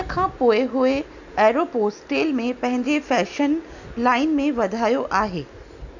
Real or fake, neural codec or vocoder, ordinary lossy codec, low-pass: real; none; none; 7.2 kHz